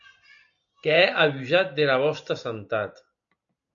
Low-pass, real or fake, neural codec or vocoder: 7.2 kHz; real; none